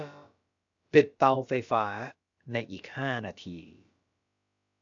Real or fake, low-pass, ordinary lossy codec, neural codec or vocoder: fake; 7.2 kHz; AAC, 64 kbps; codec, 16 kHz, about 1 kbps, DyCAST, with the encoder's durations